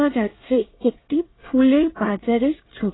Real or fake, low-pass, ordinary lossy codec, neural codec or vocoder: fake; 7.2 kHz; AAC, 16 kbps; codec, 24 kHz, 1 kbps, SNAC